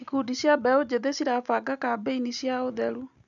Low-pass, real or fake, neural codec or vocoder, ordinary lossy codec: 7.2 kHz; real; none; none